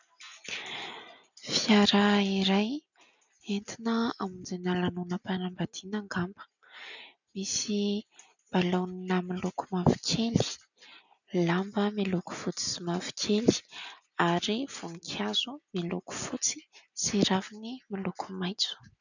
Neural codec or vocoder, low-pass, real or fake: none; 7.2 kHz; real